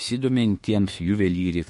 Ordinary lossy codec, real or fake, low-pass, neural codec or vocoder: MP3, 48 kbps; fake; 14.4 kHz; autoencoder, 48 kHz, 32 numbers a frame, DAC-VAE, trained on Japanese speech